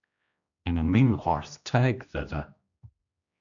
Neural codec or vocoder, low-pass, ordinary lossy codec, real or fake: codec, 16 kHz, 1 kbps, X-Codec, HuBERT features, trained on general audio; 7.2 kHz; MP3, 96 kbps; fake